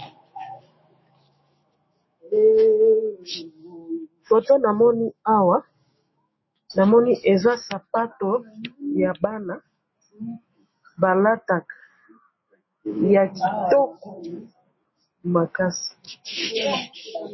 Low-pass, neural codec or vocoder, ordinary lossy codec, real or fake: 7.2 kHz; autoencoder, 48 kHz, 128 numbers a frame, DAC-VAE, trained on Japanese speech; MP3, 24 kbps; fake